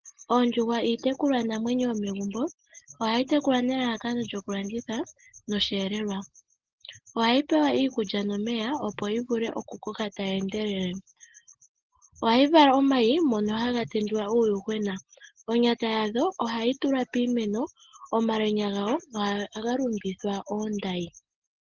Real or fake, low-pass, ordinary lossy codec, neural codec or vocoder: real; 7.2 kHz; Opus, 16 kbps; none